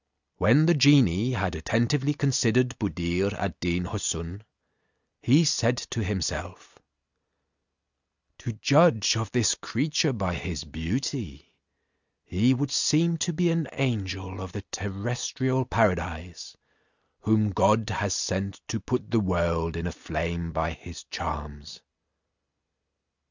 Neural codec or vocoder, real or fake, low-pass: none; real; 7.2 kHz